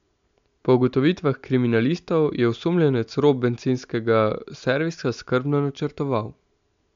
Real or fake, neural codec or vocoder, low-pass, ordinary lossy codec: real; none; 7.2 kHz; MP3, 64 kbps